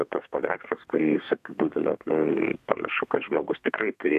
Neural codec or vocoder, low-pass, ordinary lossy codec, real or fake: codec, 32 kHz, 1.9 kbps, SNAC; 14.4 kHz; AAC, 96 kbps; fake